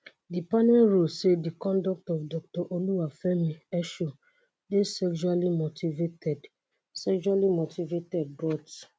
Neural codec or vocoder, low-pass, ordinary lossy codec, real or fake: none; none; none; real